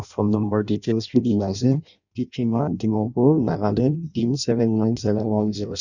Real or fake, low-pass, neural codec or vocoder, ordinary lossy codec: fake; 7.2 kHz; codec, 16 kHz in and 24 kHz out, 0.6 kbps, FireRedTTS-2 codec; none